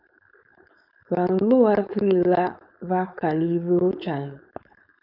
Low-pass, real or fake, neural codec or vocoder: 5.4 kHz; fake; codec, 16 kHz, 4.8 kbps, FACodec